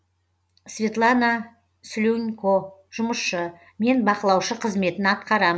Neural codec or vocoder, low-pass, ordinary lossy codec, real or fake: none; none; none; real